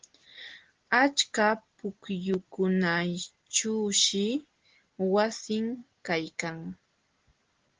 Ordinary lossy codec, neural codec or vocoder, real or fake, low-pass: Opus, 16 kbps; none; real; 7.2 kHz